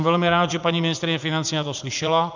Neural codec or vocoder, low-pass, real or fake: vocoder, 44.1 kHz, 80 mel bands, Vocos; 7.2 kHz; fake